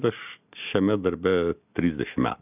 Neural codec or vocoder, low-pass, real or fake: none; 3.6 kHz; real